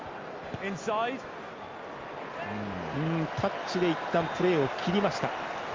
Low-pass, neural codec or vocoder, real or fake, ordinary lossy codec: 7.2 kHz; none; real; Opus, 32 kbps